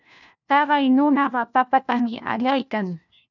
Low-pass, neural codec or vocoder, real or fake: 7.2 kHz; codec, 16 kHz, 1 kbps, FunCodec, trained on LibriTTS, 50 frames a second; fake